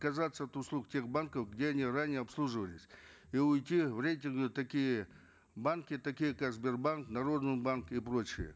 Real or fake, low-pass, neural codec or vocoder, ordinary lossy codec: real; none; none; none